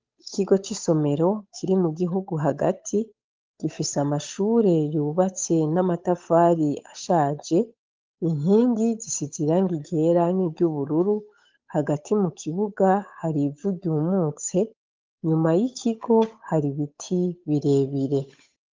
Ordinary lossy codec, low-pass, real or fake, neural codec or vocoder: Opus, 32 kbps; 7.2 kHz; fake; codec, 16 kHz, 8 kbps, FunCodec, trained on Chinese and English, 25 frames a second